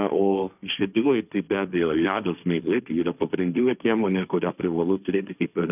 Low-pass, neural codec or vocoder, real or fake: 3.6 kHz; codec, 16 kHz, 1.1 kbps, Voila-Tokenizer; fake